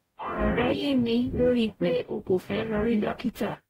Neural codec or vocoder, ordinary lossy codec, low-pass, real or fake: codec, 44.1 kHz, 0.9 kbps, DAC; AAC, 48 kbps; 19.8 kHz; fake